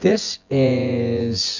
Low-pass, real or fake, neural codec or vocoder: 7.2 kHz; fake; vocoder, 24 kHz, 100 mel bands, Vocos